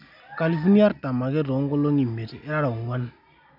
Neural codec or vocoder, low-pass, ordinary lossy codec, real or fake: none; 5.4 kHz; none; real